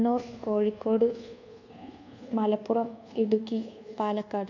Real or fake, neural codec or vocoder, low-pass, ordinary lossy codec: fake; codec, 24 kHz, 1.2 kbps, DualCodec; 7.2 kHz; none